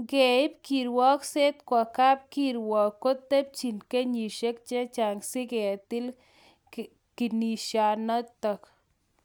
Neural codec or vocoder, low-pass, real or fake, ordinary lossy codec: none; none; real; none